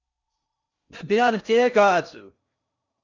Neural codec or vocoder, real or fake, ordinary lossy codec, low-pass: codec, 16 kHz in and 24 kHz out, 0.6 kbps, FocalCodec, streaming, 4096 codes; fake; Opus, 64 kbps; 7.2 kHz